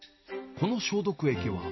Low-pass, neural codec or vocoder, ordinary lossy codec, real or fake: 7.2 kHz; none; MP3, 24 kbps; real